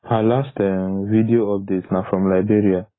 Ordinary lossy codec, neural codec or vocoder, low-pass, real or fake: AAC, 16 kbps; none; 7.2 kHz; real